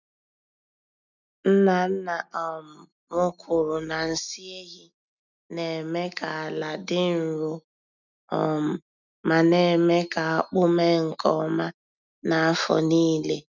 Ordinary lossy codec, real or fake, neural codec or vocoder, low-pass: none; real; none; 7.2 kHz